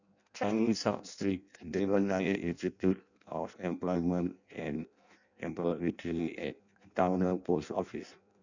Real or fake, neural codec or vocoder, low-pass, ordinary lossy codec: fake; codec, 16 kHz in and 24 kHz out, 0.6 kbps, FireRedTTS-2 codec; 7.2 kHz; none